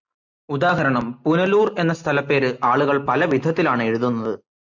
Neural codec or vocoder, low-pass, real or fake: none; 7.2 kHz; real